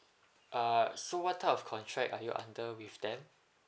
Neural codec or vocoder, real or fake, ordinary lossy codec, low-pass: none; real; none; none